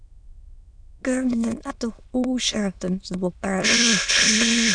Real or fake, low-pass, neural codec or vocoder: fake; 9.9 kHz; autoencoder, 22.05 kHz, a latent of 192 numbers a frame, VITS, trained on many speakers